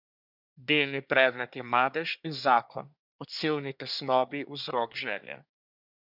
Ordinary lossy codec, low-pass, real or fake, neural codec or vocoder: none; 5.4 kHz; fake; codec, 24 kHz, 1 kbps, SNAC